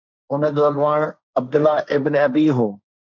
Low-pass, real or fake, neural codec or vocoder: 7.2 kHz; fake; codec, 16 kHz, 1.1 kbps, Voila-Tokenizer